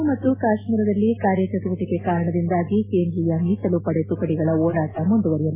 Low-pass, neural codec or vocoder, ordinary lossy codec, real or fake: 3.6 kHz; none; MP3, 16 kbps; real